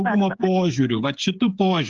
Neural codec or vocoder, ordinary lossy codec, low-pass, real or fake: codec, 16 kHz, 8 kbps, FreqCodec, smaller model; Opus, 24 kbps; 7.2 kHz; fake